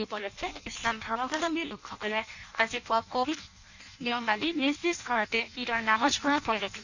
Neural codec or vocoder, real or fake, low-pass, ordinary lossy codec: codec, 16 kHz in and 24 kHz out, 0.6 kbps, FireRedTTS-2 codec; fake; 7.2 kHz; none